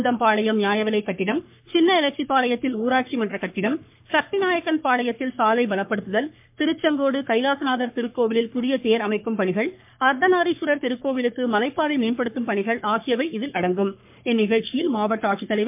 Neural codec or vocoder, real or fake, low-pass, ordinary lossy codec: codec, 44.1 kHz, 3.4 kbps, Pupu-Codec; fake; 3.6 kHz; MP3, 32 kbps